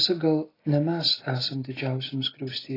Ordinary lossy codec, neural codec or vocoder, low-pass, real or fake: AAC, 24 kbps; none; 5.4 kHz; real